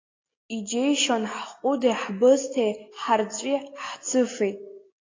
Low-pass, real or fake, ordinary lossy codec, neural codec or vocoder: 7.2 kHz; real; AAC, 32 kbps; none